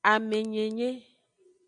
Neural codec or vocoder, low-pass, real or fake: none; 9.9 kHz; real